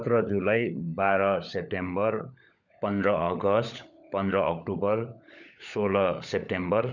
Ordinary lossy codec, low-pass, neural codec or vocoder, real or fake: none; 7.2 kHz; codec, 16 kHz, 16 kbps, FunCodec, trained on LibriTTS, 50 frames a second; fake